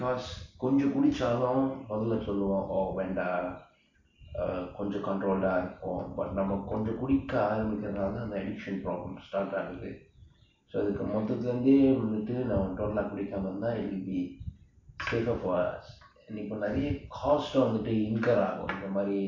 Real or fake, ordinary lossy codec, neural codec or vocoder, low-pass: real; AAC, 48 kbps; none; 7.2 kHz